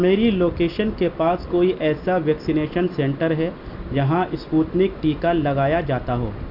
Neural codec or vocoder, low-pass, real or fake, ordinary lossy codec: none; 5.4 kHz; real; none